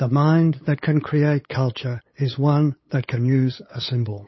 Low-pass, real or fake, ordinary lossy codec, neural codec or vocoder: 7.2 kHz; fake; MP3, 24 kbps; codec, 16 kHz, 8 kbps, FunCodec, trained on LibriTTS, 25 frames a second